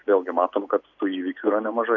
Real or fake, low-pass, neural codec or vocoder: real; 7.2 kHz; none